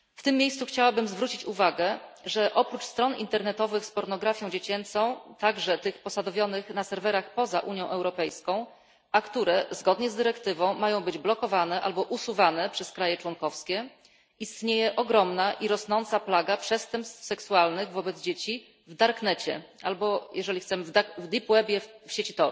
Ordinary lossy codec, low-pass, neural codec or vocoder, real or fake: none; none; none; real